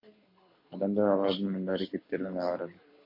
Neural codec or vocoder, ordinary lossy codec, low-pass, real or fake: codec, 24 kHz, 6 kbps, HILCodec; MP3, 32 kbps; 5.4 kHz; fake